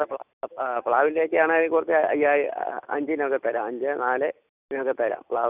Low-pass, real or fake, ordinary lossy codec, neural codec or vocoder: 3.6 kHz; real; none; none